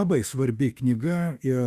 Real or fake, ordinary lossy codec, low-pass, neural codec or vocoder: fake; Opus, 64 kbps; 14.4 kHz; autoencoder, 48 kHz, 32 numbers a frame, DAC-VAE, trained on Japanese speech